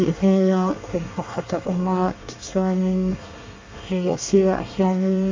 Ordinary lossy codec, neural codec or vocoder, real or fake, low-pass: MP3, 64 kbps; codec, 24 kHz, 1 kbps, SNAC; fake; 7.2 kHz